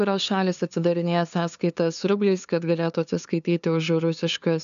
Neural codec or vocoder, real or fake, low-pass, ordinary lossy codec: codec, 16 kHz, 2 kbps, FunCodec, trained on LibriTTS, 25 frames a second; fake; 7.2 kHz; MP3, 96 kbps